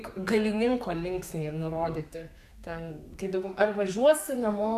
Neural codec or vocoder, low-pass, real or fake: codec, 32 kHz, 1.9 kbps, SNAC; 14.4 kHz; fake